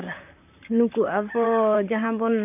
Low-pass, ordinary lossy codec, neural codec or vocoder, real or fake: 3.6 kHz; none; vocoder, 44.1 kHz, 128 mel bands, Pupu-Vocoder; fake